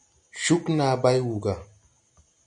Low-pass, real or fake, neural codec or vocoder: 9.9 kHz; real; none